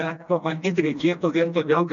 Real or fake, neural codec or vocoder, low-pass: fake; codec, 16 kHz, 1 kbps, FreqCodec, smaller model; 7.2 kHz